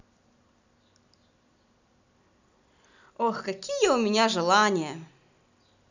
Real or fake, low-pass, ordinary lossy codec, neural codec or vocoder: real; 7.2 kHz; none; none